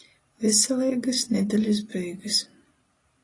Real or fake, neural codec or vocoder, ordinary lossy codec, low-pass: real; none; AAC, 32 kbps; 10.8 kHz